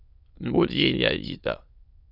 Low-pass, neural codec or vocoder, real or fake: 5.4 kHz; autoencoder, 22.05 kHz, a latent of 192 numbers a frame, VITS, trained on many speakers; fake